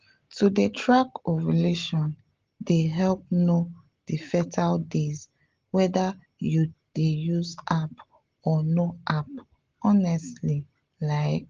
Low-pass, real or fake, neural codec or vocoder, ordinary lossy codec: 7.2 kHz; real; none; Opus, 16 kbps